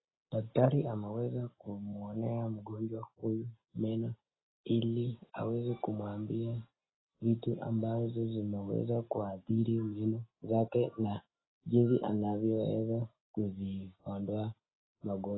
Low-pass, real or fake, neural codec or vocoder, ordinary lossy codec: 7.2 kHz; real; none; AAC, 16 kbps